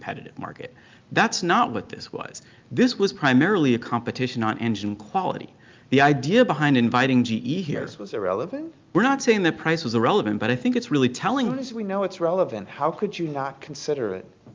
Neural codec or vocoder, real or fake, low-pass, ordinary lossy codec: none; real; 7.2 kHz; Opus, 24 kbps